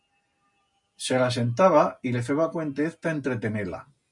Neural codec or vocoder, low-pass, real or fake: none; 10.8 kHz; real